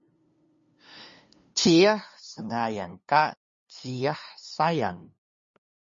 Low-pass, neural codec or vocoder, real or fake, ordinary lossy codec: 7.2 kHz; codec, 16 kHz, 2 kbps, FunCodec, trained on LibriTTS, 25 frames a second; fake; MP3, 32 kbps